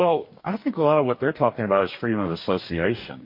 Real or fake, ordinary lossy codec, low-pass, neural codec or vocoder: fake; MP3, 32 kbps; 5.4 kHz; codec, 44.1 kHz, 2.6 kbps, DAC